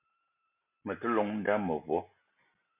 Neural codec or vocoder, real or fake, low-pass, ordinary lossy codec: none; real; 3.6 kHz; MP3, 32 kbps